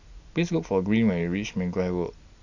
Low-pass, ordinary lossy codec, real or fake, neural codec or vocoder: 7.2 kHz; none; real; none